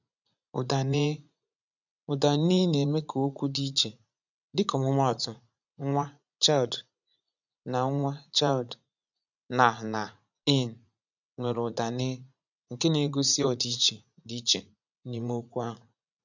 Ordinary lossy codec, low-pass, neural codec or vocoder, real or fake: none; 7.2 kHz; vocoder, 44.1 kHz, 80 mel bands, Vocos; fake